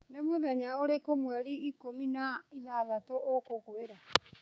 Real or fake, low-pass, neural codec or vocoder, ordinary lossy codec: fake; none; codec, 16 kHz, 6 kbps, DAC; none